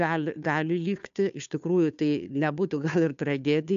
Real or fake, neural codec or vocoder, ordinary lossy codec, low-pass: fake; codec, 16 kHz, 2 kbps, FunCodec, trained on Chinese and English, 25 frames a second; AAC, 96 kbps; 7.2 kHz